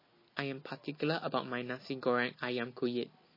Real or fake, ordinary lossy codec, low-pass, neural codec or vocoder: real; MP3, 24 kbps; 5.4 kHz; none